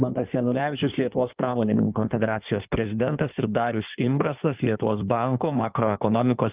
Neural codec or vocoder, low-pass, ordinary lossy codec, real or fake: codec, 16 kHz in and 24 kHz out, 1.1 kbps, FireRedTTS-2 codec; 3.6 kHz; Opus, 24 kbps; fake